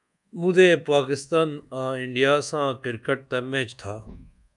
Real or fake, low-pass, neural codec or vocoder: fake; 10.8 kHz; codec, 24 kHz, 1.2 kbps, DualCodec